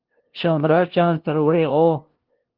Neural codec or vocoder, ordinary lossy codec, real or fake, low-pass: codec, 16 kHz, 0.5 kbps, FunCodec, trained on LibriTTS, 25 frames a second; Opus, 16 kbps; fake; 5.4 kHz